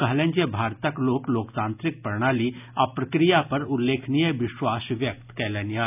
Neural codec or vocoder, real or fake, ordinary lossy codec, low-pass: none; real; none; 3.6 kHz